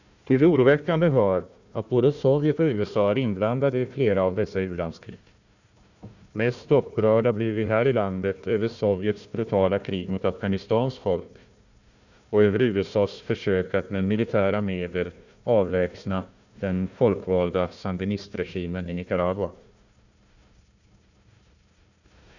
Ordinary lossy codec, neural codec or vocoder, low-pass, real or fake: none; codec, 16 kHz, 1 kbps, FunCodec, trained on Chinese and English, 50 frames a second; 7.2 kHz; fake